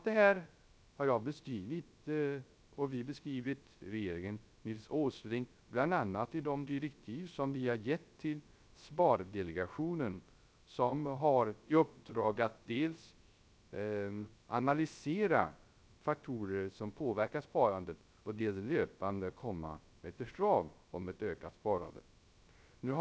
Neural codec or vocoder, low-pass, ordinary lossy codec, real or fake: codec, 16 kHz, 0.3 kbps, FocalCodec; none; none; fake